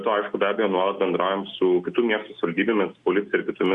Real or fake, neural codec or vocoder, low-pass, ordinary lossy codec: real; none; 10.8 kHz; Opus, 64 kbps